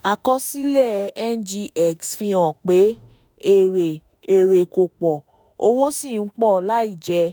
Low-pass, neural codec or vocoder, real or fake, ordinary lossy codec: none; autoencoder, 48 kHz, 32 numbers a frame, DAC-VAE, trained on Japanese speech; fake; none